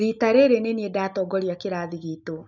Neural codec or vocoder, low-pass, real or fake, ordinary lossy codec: none; 7.2 kHz; real; none